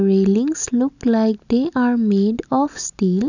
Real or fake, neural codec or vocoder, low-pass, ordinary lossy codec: real; none; 7.2 kHz; none